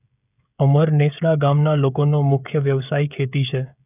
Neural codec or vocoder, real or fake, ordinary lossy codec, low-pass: codec, 16 kHz, 16 kbps, FreqCodec, smaller model; fake; none; 3.6 kHz